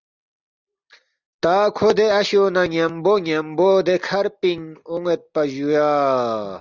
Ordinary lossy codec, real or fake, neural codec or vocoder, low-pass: Opus, 64 kbps; real; none; 7.2 kHz